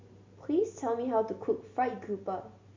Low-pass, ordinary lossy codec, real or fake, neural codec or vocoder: 7.2 kHz; MP3, 48 kbps; real; none